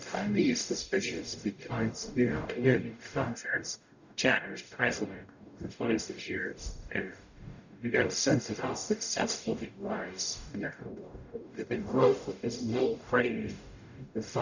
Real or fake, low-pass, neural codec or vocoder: fake; 7.2 kHz; codec, 44.1 kHz, 0.9 kbps, DAC